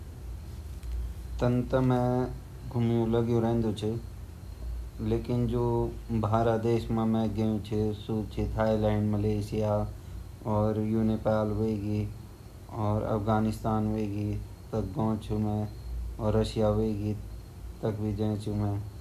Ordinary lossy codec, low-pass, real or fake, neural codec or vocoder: none; 14.4 kHz; real; none